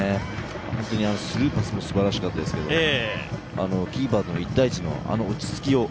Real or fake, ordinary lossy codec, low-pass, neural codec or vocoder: real; none; none; none